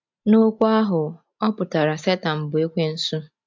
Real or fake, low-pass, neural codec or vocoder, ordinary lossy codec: real; 7.2 kHz; none; MP3, 64 kbps